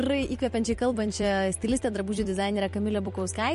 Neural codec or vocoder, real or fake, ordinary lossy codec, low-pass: vocoder, 44.1 kHz, 128 mel bands every 256 samples, BigVGAN v2; fake; MP3, 48 kbps; 14.4 kHz